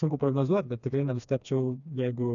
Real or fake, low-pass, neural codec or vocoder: fake; 7.2 kHz; codec, 16 kHz, 2 kbps, FreqCodec, smaller model